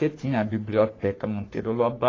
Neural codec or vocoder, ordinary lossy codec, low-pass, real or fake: codec, 44.1 kHz, 3.4 kbps, Pupu-Codec; AAC, 32 kbps; 7.2 kHz; fake